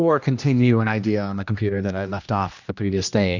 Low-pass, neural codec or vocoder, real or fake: 7.2 kHz; codec, 16 kHz, 1 kbps, X-Codec, HuBERT features, trained on general audio; fake